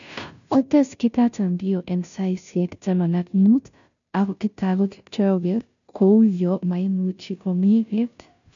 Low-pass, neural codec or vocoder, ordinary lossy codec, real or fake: 7.2 kHz; codec, 16 kHz, 0.5 kbps, FunCodec, trained on Chinese and English, 25 frames a second; none; fake